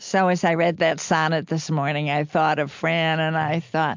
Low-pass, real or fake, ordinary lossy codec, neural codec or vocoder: 7.2 kHz; real; MP3, 64 kbps; none